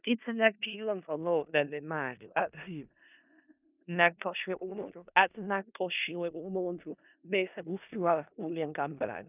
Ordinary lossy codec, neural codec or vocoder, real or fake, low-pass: none; codec, 16 kHz in and 24 kHz out, 0.4 kbps, LongCat-Audio-Codec, four codebook decoder; fake; 3.6 kHz